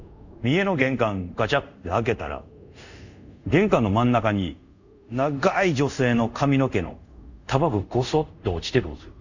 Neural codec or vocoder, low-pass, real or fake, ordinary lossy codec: codec, 24 kHz, 0.5 kbps, DualCodec; 7.2 kHz; fake; none